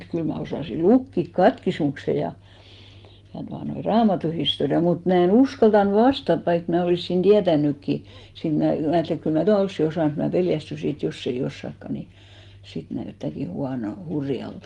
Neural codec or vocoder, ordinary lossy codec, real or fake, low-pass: none; Opus, 24 kbps; real; 10.8 kHz